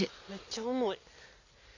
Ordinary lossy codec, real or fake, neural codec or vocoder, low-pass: AAC, 48 kbps; real; none; 7.2 kHz